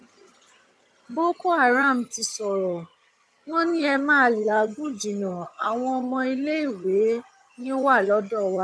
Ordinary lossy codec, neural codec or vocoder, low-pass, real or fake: none; vocoder, 22.05 kHz, 80 mel bands, HiFi-GAN; none; fake